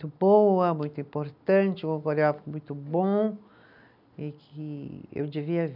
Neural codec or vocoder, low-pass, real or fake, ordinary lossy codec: none; 5.4 kHz; real; none